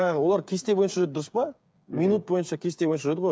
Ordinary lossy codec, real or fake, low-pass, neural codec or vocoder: none; fake; none; codec, 16 kHz, 8 kbps, FreqCodec, smaller model